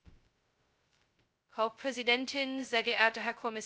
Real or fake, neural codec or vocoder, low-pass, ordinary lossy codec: fake; codec, 16 kHz, 0.2 kbps, FocalCodec; none; none